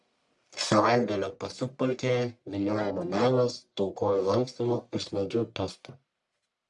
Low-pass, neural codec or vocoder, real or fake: 10.8 kHz; codec, 44.1 kHz, 1.7 kbps, Pupu-Codec; fake